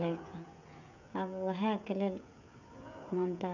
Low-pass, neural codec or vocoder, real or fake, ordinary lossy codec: 7.2 kHz; codec, 16 kHz, 16 kbps, FreqCodec, smaller model; fake; none